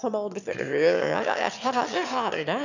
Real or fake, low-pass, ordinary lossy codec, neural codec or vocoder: fake; 7.2 kHz; none; autoencoder, 22.05 kHz, a latent of 192 numbers a frame, VITS, trained on one speaker